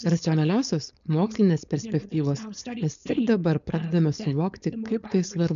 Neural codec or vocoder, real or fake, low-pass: codec, 16 kHz, 4.8 kbps, FACodec; fake; 7.2 kHz